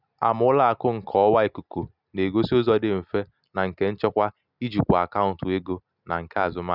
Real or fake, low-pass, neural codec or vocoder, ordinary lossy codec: real; 5.4 kHz; none; none